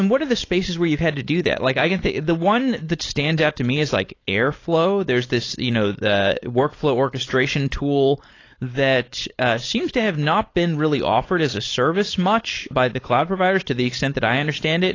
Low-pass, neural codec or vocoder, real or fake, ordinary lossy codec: 7.2 kHz; codec, 16 kHz, 4.8 kbps, FACodec; fake; AAC, 32 kbps